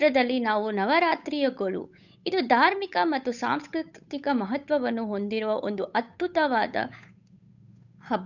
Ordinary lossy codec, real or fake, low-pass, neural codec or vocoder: none; fake; 7.2 kHz; codec, 16 kHz, 8 kbps, FunCodec, trained on Chinese and English, 25 frames a second